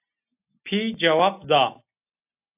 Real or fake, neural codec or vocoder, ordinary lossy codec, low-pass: real; none; AAC, 32 kbps; 3.6 kHz